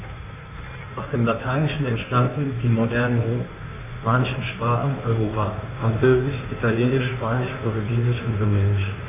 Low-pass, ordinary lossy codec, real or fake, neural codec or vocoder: 3.6 kHz; none; fake; codec, 16 kHz in and 24 kHz out, 1.1 kbps, FireRedTTS-2 codec